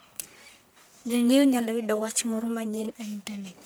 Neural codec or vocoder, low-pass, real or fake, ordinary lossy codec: codec, 44.1 kHz, 1.7 kbps, Pupu-Codec; none; fake; none